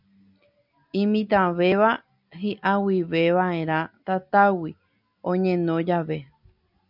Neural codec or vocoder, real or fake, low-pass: none; real; 5.4 kHz